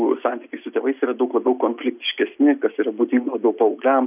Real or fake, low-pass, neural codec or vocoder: real; 3.6 kHz; none